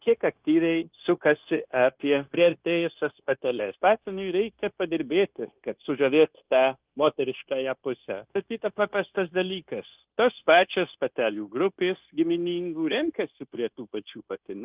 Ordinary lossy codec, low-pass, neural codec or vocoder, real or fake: Opus, 24 kbps; 3.6 kHz; codec, 16 kHz, 0.9 kbps, LongCat-Audio-Codec; fake